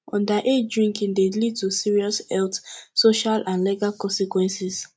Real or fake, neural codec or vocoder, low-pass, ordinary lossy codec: real; none; none; none